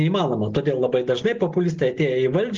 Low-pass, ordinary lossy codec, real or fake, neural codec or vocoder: 7.2 kHz; Opus, 16 kbps; real; none